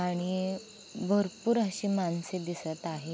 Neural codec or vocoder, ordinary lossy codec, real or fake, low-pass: none; none; real; none